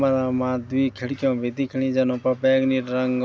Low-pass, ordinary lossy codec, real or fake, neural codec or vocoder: none; none; real; none